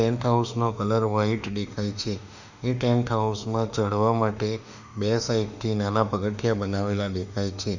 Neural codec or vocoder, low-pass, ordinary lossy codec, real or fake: autoencoder, 48 kHz, 32 numbers a frame, DAC-VAE, trained on Japanese speech; 7.2 kHz; none; fake